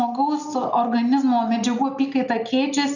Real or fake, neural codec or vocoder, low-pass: real; none; 7.2 kHz